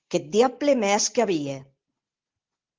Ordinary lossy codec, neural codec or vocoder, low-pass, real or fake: Opus, 16 kbps; none; 7.2 kHz; real